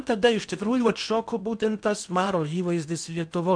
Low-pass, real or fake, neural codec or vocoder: 9.9 kHz; fake; codec, 16 kHz in and 24 kHz out, 0.8 kbps, FocalCodec, streaming, 65536 codes